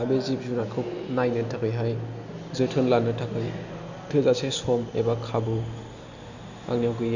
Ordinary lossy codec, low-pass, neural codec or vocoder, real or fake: Opus, 64 kbps; 7.2 kHz; none; real